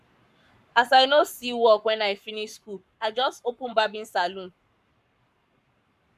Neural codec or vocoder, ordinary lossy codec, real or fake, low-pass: codec, 44.1 kHz, 7.8 kbps, Pupu-Codec; none; fake; 14.4 kHz